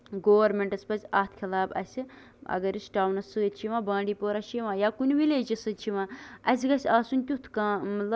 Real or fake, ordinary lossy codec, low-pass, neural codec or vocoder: real; none; none; none